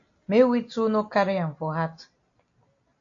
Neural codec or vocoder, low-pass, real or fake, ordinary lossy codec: none; 7.2 kHz; real; AAC, 48 kbps